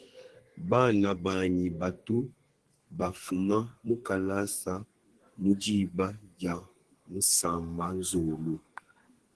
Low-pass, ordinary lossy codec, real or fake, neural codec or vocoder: 10.8 kHz; Opus, 16 kbps; fake; codec, 32 kHz, 1.9 kbps, SNAC